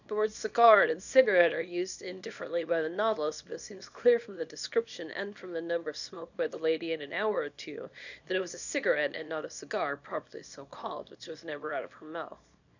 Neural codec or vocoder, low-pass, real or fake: codec, 24 kHz, 0.9 kbps, WavTokenizer, small release; 7.2 kHz; fake